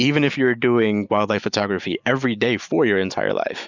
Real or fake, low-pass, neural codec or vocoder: real; 7.2 kHz; none